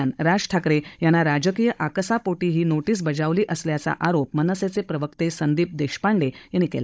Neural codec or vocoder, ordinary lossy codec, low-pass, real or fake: codec, 16 kHz, 16 kbps, FunCodec, trained on Chinese and English, 50 frames a second; none; none; fake